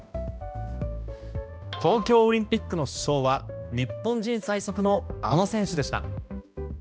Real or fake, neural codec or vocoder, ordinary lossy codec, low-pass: fake; codec, 16 kHz, 1 kbps, X-Codec, HuBERT features, trained on balanced general audio; none; none